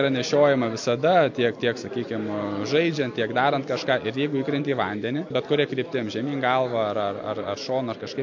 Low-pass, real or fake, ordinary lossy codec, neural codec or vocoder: 7.2 kHz; real; MP3, 48 kbps; none